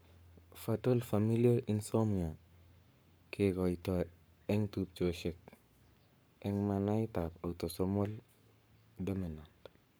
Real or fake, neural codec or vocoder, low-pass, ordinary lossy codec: fake; codec, 44.1 kHz, 7.8 kbps, Pupu-Codec; none; none